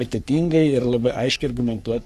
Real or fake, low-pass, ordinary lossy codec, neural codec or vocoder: fake; 14.4 kHz; Opus, 64 kbps; codec, 44.1 kHz, 3.4 kbps, Pupu-Codec